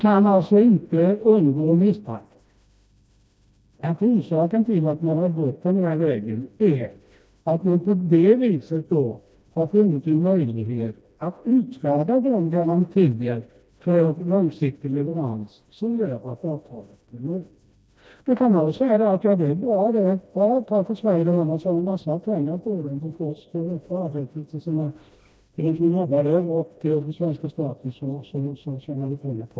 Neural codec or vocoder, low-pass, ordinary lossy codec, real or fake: codec, 16 kHz, 1 kbps, FreqCodec, smaller model; none; none; fake